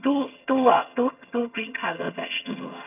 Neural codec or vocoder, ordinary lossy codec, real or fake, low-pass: vocoder, 22.05 kHz, 80 mel bands, HiFi-GAN; none; fake; 3.6 kHz